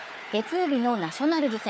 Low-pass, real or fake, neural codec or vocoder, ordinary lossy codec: none; fake; codec, 16 kHz, 4 kbps, FunCodec, trained on Chinese and English, 50 frames a second; none